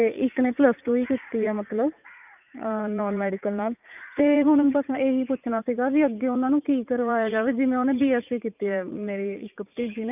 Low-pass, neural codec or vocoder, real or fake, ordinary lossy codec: 3.6 kHz; vocoder, 44.1 kHz, 80 mel bands, Vocos; fake; none